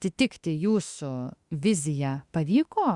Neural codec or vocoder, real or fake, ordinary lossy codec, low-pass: autoencoder, 48 kHz, 32 numbers a frame, DAC-VAE, trained on Japanese speech; fake; Opus, 64 kbps; 10.8 kHz